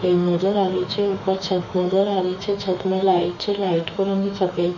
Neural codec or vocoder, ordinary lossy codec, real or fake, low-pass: autoencoder, 48 kHz, 32 numbers a frame, DAC-VAE, trained on Japanese speech; none; fake; 7.2 kHz